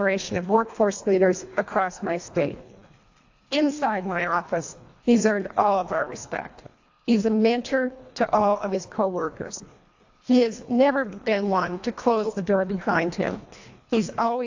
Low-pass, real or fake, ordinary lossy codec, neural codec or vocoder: 7.2 kHz; fake; AAC, 48 kbps; codec, 24 kHz, 1.5 kbps, HILCodec